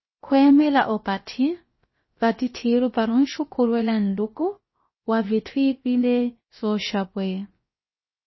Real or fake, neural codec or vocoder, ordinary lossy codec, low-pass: fake; codec, 16 kHz, about 1 kbps, DyCAST, with the encoder's durations; MP3, 24 kbps; 7.2 kHz